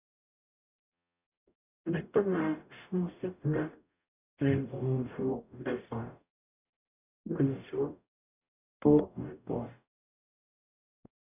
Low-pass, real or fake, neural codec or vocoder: 3.6 kHz; fake; codec, 44.1 kHz, 0.9 kbps, DAC